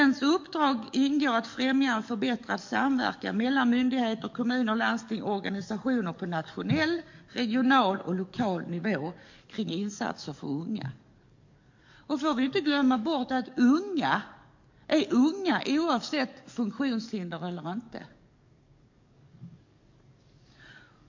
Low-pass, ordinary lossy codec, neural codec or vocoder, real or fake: 7.2 kHz; MP3, 48 kbps; codec, 44.1 kHz, 7.8 kbps, DAC; fake